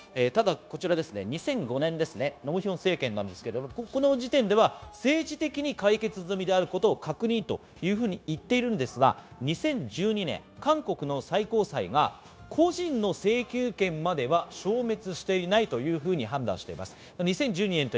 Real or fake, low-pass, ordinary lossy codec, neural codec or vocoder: fake; none; none; codec, 16 kHz, 0.9 kbps, LongCat-Audio-Codec